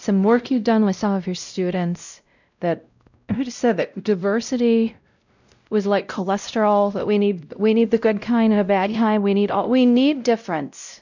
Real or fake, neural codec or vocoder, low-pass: fake; codec, 16 kHz, 0.5 kbps, X-Codec, WavLM features, trained on Multilingual LibriSpeech; 7.2 kHz